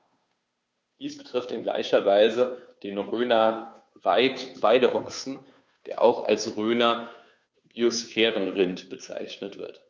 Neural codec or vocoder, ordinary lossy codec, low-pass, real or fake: codec, 16 kHz, 2 kbps, FunCodec, trained on Chinese and English, 25 frames a second; none; none; fake